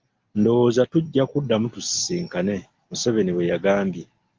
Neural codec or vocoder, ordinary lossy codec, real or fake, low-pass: none; Opus, 24 kbps; real; 7.2 kHz